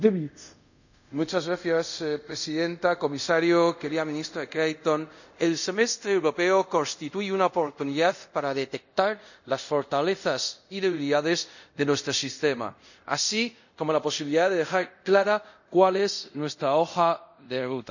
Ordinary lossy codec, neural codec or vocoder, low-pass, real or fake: none; codec, 24 kHz, 0.5 kbps, DualCodec; 7.2 kHz; fake